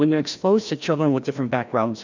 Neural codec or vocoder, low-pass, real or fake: codec, 16 kHz, 1 kbps, FreqCodec, larger model; 7.2 kHz; fake